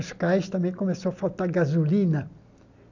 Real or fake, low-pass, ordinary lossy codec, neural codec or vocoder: real; 7.2 kHz; none; none